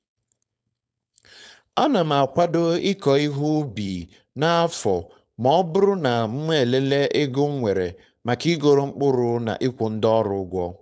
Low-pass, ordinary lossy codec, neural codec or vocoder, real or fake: none; none; codec, 16 kHz, 4.8 kbps, FACodec; fake